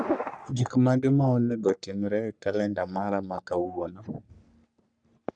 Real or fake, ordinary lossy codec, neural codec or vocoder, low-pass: fake; none; codec, 44.1 kHz, 3.4 kbps, Pupu-Codec; 9.9 kHz